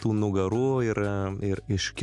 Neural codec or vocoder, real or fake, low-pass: none; real; 10.8 kHz